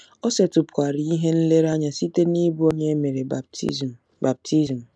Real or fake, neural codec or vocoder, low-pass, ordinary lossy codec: real; none; none; none